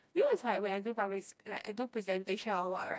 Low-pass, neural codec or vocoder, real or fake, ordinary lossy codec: none; codec, 16 kHz, 1 kbps, FreqCodec, smaller model; fake; none